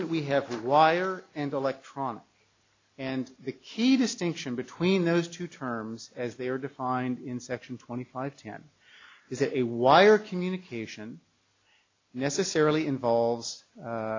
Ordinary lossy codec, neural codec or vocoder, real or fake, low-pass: MP3, 64 kbps; none; real; 7.2 kHz